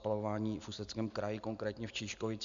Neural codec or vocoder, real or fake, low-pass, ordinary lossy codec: none; real; 7.2 kHz; AAC, 96 kbps